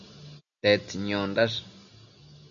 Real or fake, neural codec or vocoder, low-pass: real; none; 7.2 kHz